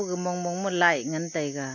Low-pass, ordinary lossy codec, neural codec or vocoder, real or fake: 7.2 kHz; AAC, 48 kbps; none; real